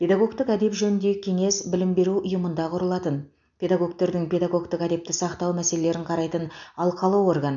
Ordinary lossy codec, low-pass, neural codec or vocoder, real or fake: none; 7.2 kHz; none; real